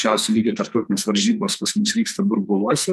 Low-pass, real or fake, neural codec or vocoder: 14.4 kHz; fake; codec, 44.1 kHz, 2.6 kbps, SNAC